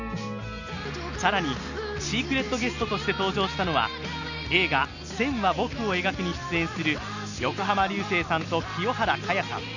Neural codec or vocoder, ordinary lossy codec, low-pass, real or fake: none; none; 7.2 kHz; real